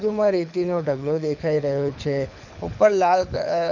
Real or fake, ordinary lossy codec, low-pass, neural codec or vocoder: fake; none; 7.2 kHz; codec, 24 kHz, 6 kbps, HILCodec